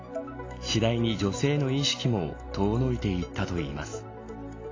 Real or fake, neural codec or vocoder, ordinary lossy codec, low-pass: real; none; AAC, 32 kbps; 7.2 kHz